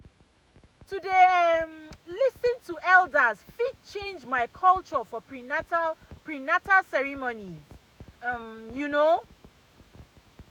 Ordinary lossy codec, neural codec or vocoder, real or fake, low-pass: none; autoencoder, 48 kHz, 128 numbers a frame, DAC-VAE, trained on Japanese speech; fake; 19.8 kHz